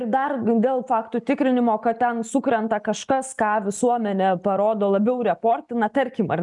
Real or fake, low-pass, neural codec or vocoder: real; 10.8 kHz; none